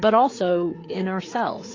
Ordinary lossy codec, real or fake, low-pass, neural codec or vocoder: AAC, 32 kbps; fake; 7.2 kHz; codec, 16 kHz, 4 kbps, FreqCodec, larger model